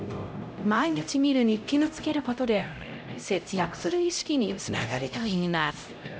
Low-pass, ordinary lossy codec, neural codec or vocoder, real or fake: none; none; codec, 16 kHz, 0.5 kbps, X-Codec, HuBERT features, trained on LibriSpeech; fake